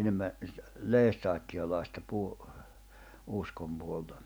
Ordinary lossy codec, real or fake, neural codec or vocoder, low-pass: none; real; none; none